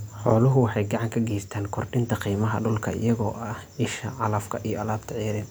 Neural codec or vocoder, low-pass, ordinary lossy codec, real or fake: none; none; none; real